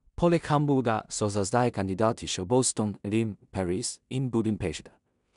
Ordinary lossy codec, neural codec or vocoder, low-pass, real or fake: none; codec, 16 kHz in and 24 kHz out, 0.4 kbps, LongCat-Audio-Codec, two codebook decoder; 10.8 kHz; fake